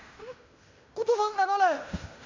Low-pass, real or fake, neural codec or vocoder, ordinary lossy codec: 7.2 kHz; fake; codec, 16 kHz in and 24 kHz out, 0.9 kbps, LongCat-Audio-Codec, fine tuned four codebook decoder; MP3, 64 kbps